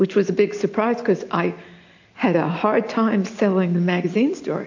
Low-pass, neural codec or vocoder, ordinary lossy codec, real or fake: 7.2 kHz; none; AAC, 48 kbps; real